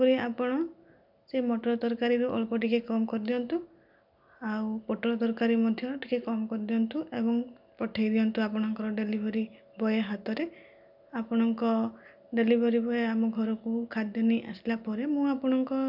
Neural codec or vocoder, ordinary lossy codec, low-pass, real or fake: none; none; 5.4 kHz; real